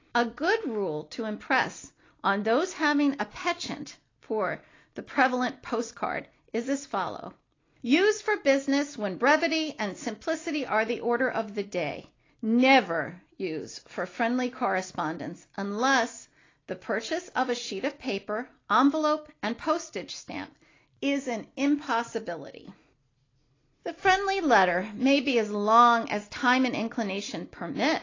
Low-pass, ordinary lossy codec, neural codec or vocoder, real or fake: 7.2 kHz; AAC, 32 kbps; none; real